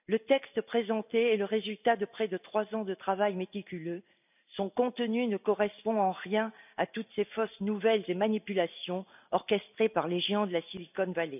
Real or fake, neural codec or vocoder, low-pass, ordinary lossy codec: real; none; 3.6 kHz; none